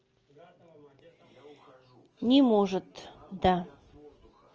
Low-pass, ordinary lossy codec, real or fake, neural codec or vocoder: 7.2 kHz; Opus, 24 kbps; real; none